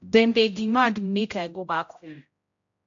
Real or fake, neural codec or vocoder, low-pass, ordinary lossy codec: fake; codec, 16 kHz, 0.5 kbps, X-Codec, HuBERT features, trained on general audio; 7.2 kHz; AAC, 64 kbps